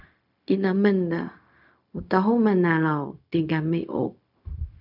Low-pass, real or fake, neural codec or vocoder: 5.4 kHz; fake; codec, 16 kHz, 0.4 kbps, LongCat-Audio-Codec